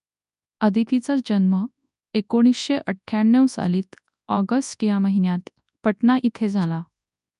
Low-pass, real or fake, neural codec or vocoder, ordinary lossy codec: 10.8 kHz; fake; codec, 24 kHz, 0.9 kbps, WavTokenizer, large speech release; none